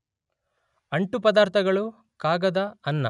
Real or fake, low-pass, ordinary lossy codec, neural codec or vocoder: real; 10.8 kHz; none; none